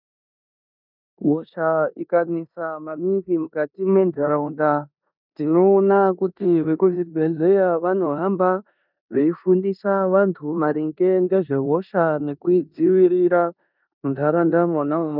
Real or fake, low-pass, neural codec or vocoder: fake; 5.4 kHz; codec, 16 kHz in and 24 kHz out, 0.9 kbps, LongCat-Audio-Codec, four codebook decoder